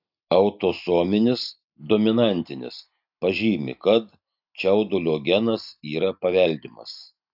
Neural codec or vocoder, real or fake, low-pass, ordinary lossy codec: none; real; 5.4 kHz; AAC, 48 kbps